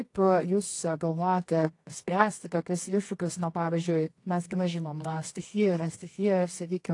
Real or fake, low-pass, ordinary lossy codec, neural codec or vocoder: fake; 10.8 kHz; AAC, 48 kbps; codec, 24 kHz, 0.9 kbps, WavTokenizer, medium music audio release